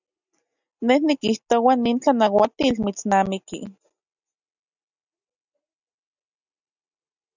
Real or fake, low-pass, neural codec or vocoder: real; 7.2 kHz; none